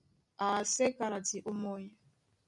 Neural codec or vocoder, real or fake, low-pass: none; real; 10.8 kHz